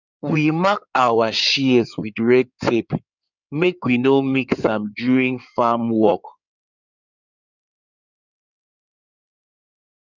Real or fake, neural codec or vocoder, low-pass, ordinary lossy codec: fake; codec, 16 kHz in and 24 kHz out, 2.2 kbps, FireRedTTS-2 codec; 7.2 kHz; none